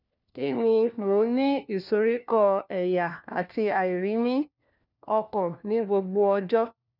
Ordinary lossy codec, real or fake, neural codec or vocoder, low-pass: none; fake; codec, 16 kHz, 1 kbps, FunCodec, trained on LibriTTS, 50 frames a second; 5.4 kHz